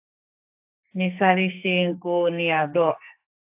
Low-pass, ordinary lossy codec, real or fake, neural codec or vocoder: 3.6 kHz; AAC, 32 kbps; fake; codec, 44.1 kHz, 2.6 kbps, SNAC